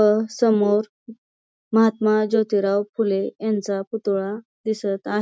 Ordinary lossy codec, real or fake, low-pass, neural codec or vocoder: none; real; none; none